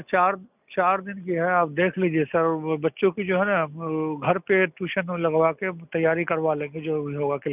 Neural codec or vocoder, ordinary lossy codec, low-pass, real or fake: none; none; 3.6 kHz; real